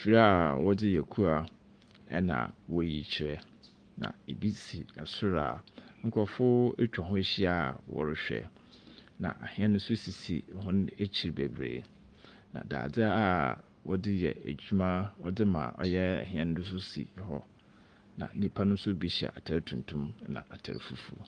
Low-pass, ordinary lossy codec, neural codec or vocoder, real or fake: 9.9 kHz; Opus, 32 kbps; codec, 44.1 kHz, 7.8 kbps, Pupu-Codec; fake